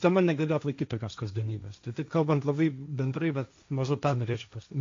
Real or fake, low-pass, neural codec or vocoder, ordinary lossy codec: fake; 7.2 kHz; codec, 16 kHz, 1.1 kbps, Voila-Tokenizer; AAC, 48 kbps